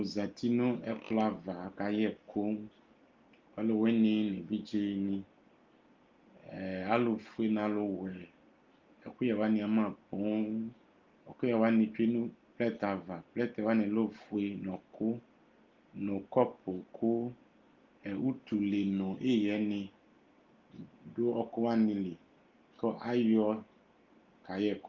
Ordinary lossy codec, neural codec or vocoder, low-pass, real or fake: Opus, 32 kbps; none; 7.2 kHz; real